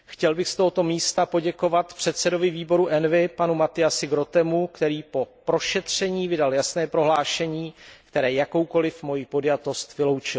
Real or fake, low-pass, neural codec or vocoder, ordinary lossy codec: real; none; none; none